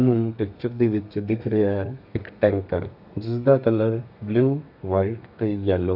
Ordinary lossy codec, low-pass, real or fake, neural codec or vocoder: none; 5.4 kHz; fake; codec, 32 kHz, 1.9 kbps, SNAC